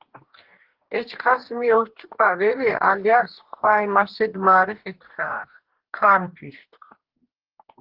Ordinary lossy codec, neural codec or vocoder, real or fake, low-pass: Opus, 16 kbps; codec, 44.1 kHz, 2.6 kbps, DAC; fake; 5.4 kHz